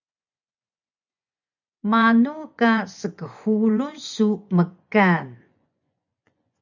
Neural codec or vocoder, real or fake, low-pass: vocoder, 24 kHz, 100 mel bands, Vocos; fake; 7.2 kHz